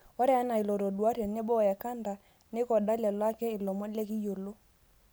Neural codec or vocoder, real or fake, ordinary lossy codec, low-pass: none; real; none; none